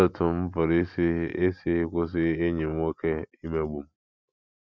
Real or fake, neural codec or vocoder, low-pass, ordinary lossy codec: real; none; none; none